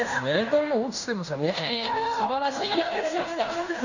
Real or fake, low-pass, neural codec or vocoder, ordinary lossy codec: fake; 7.2 kHz; codec, 16 kHz in and 24 kHz out, 0.9 kbps, LongCat-Audio-Codec, fine tuned four codebook decoder; none